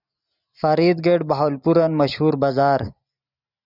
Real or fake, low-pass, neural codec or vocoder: real; 5.4 kHz; none